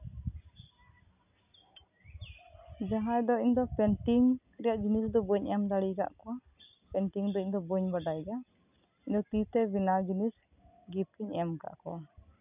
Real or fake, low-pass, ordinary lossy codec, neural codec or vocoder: real; 3.6 kHz; none; none